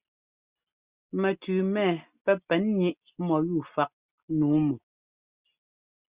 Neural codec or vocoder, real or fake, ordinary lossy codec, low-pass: none; real; Opus, 64 kbps; 3.6 kHz